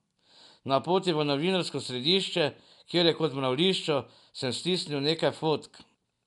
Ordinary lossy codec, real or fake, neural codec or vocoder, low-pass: none; real; none; 10.8 kHz